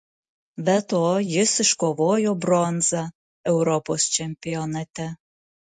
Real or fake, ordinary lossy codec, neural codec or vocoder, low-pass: real; MP3, 48 kbps; none; 10.8 kHz